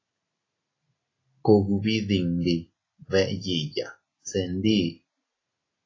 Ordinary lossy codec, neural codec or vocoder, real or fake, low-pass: AAC, 32 kbps; none; real; 7.2 kHz